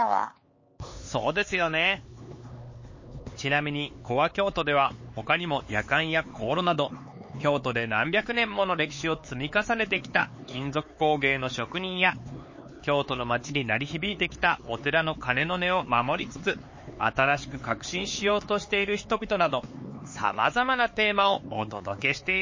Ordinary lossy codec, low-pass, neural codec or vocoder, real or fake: MP3, 32 kbps; 7.2 kHz; codec, 16 kHz, 4 kbps, X-Codec, HuBERT features, trained on LibriSpeech; fake